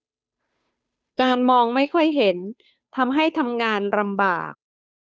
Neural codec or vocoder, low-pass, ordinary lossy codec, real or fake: codec, 16 kHz, 2 kbps, FunCodec, trained on Chinese and English, 25 frames a second; none; none; fake